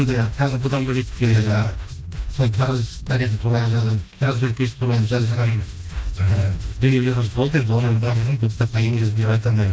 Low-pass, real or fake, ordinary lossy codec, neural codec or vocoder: none; fake; none; codec, 16 kHz, 1 kbps, FreqCodec, smaller model